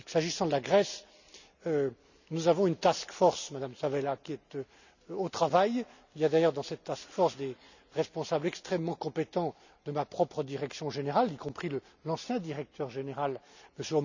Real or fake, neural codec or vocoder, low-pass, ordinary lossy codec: real; none; 7.2 kHz; none